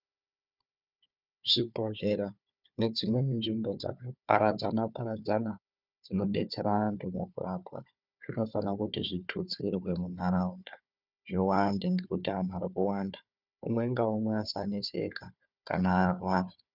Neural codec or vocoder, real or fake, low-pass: codec, 16 kHz, 4 kbps, FunCodec, trained on Chinese and English, 50 frames a second; fake; 5.4 kHz